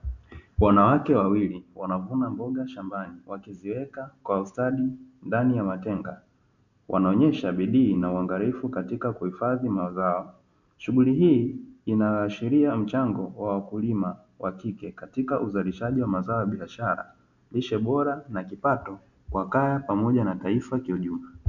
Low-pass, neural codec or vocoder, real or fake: 7.2 kHz; none; real